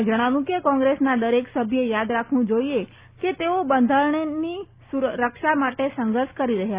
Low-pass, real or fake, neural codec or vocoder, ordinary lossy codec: 3.6 kHz; real; none; Opus, 64 kbps